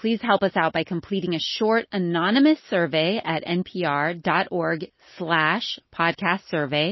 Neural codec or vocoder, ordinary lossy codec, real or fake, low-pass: none; MP3, 24 kbps; real; 7.2 kHz